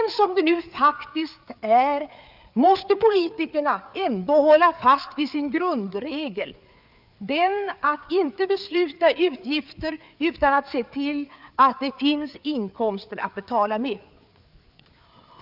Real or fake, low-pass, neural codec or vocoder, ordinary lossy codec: fake; 5.4 kHz; codec, 16 kHz, 4 kbps, FreqCodec, larger model; none